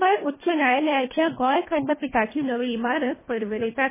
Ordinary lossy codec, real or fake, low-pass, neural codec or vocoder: MP3, 16 kbps; fake; 3.6 kHz; codec, 24 kHz, 1.5 kbps, HILCodec